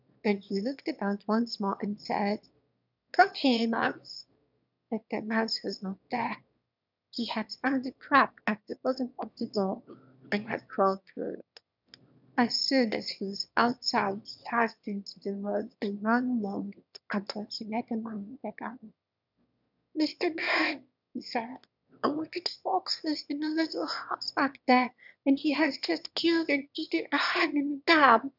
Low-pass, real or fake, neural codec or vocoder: 5.4 kHz; fake; autoencoder, 22.05 kHz, a latent of 192 numbers a frame, VITS, trained on one speaker